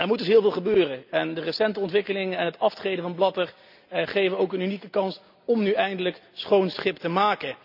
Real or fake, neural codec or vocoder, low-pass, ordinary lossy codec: real; none; 5.4 kHz; none